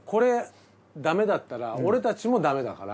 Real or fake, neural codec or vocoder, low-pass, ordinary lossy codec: real; none; none; none